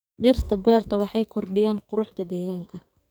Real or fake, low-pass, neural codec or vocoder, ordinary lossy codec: fake; none; codec, 44.1 kHz, 2.6 kbps, SNAC; none